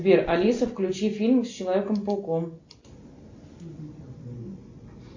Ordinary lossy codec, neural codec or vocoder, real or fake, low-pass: MP3, 48 kbps; none; real; 7.2 kHz